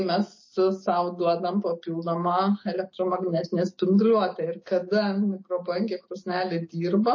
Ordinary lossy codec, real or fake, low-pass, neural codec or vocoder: MP3, 32 kbps; real; 7.2 kHz; none